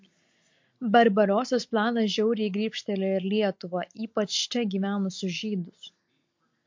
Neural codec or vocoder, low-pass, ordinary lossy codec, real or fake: none; 7.2 kHz; MP3, 48 kbps; real